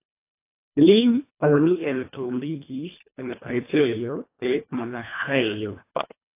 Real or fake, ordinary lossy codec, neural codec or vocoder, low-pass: fake; AAC, 24 kbps; codec, 24 kHz, 1.5 kbps, HILCodec; 3.6 kHz